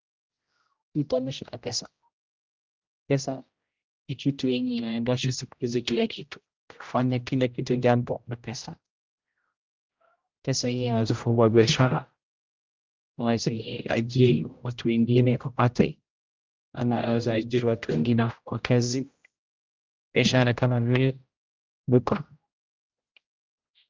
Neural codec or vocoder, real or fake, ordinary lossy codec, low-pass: codec, 16 kHz, 0.5 kbps, X-Codec, HuBERT features, trained on general audio; fake; Opus, 24 kbps; 7.2 kHz